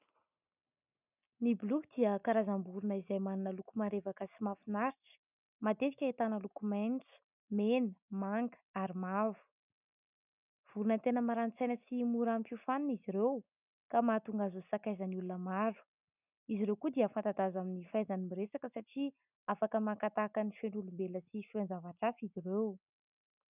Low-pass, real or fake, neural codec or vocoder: 3.6 kHz; real; none